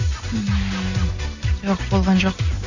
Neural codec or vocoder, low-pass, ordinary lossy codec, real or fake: none; 7.2 kHz; none; real